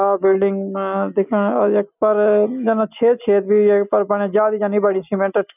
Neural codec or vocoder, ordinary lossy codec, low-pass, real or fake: none; none; 3.6 kHz; real